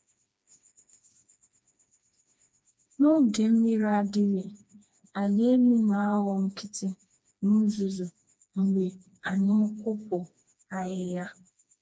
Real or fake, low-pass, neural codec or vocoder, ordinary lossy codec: fake; none; codec, 16 kHz, 2 kbps, FreqCodec, smaller model; none